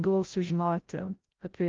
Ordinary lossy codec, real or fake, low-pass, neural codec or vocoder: Opus, 32 kbps; fake; 7.2 kHz; codec, 16 kHz, 0.5 kbps, FreqCodec, larger model